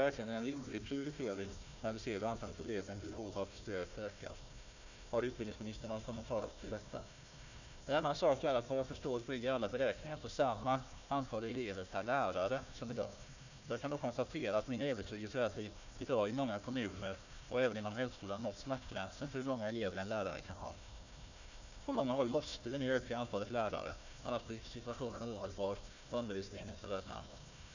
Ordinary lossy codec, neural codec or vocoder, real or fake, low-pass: none; codec, 16 kHz, 1 kbps, FunCodec, trained on Chinese and English, 50 frames a second; fake; 7.2 kHz